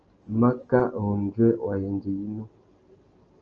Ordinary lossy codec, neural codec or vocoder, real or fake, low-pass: Opus, 24 kbps; none; real; 7.2 kHz